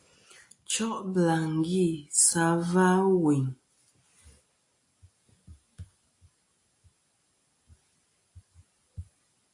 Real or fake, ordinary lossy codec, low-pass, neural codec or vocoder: real; AAC, 48 kbps; 10.8 kHz; none